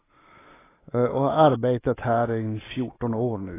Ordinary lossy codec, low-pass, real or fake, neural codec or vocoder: AAC, 16 kbps; 3.6 kHz; real; none